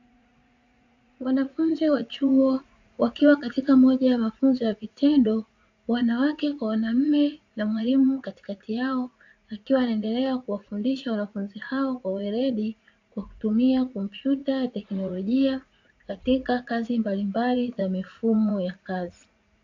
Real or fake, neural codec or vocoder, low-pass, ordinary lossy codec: fake; vocoder, 44.1 kHz, 80 mel bands, Vocos; 7.2 kHz; AAC, 48 kbps